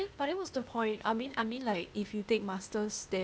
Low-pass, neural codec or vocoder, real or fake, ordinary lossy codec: none; codec, 16 kHz, 0.8 kbps, ZipCodec; fake; none